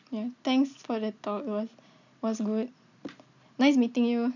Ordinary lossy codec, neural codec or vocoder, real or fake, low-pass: none; none; real; 7.2 kHz